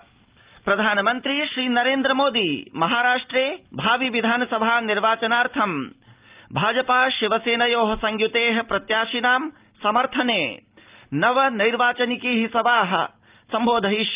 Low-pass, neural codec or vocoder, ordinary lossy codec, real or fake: 3.6 kHz; none; Opus, 32 kbps; real